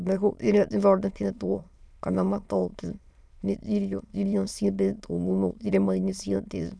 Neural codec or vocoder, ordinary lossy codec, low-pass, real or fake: autoencoder, 22.05 kHz, a latent of 192 numbers a frame, VITS, trained on many speakers; none; none; fake